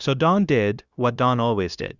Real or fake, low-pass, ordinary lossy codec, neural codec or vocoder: fake; 7.2 kHz; Opus, 64 kbps; codec, 16 kHz, 0.9 kbps, LongCat-Audio-Codec